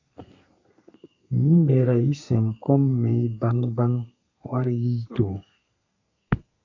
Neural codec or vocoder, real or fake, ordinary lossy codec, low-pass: codec, 32 kHz, 1.9 kbps, SNAC; fake; AAC, 48 kbps; 7.2 kHz